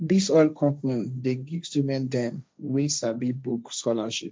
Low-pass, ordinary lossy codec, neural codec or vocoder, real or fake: none; none; codec, 16 kHz, 1.1 kbps, Voila-Tokenizer; fake